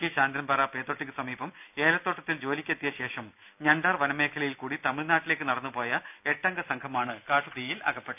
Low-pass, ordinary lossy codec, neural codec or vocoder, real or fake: 3.6 kHz; none; none; real